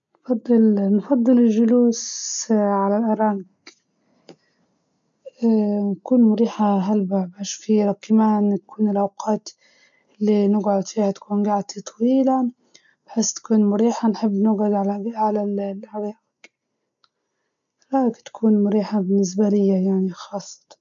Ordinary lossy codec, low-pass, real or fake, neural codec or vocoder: none; 7.2 kHz; real; none